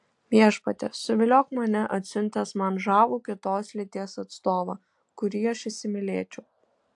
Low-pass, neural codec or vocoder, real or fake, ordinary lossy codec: 10.8 kHz; none; real; AAC, 64 kbps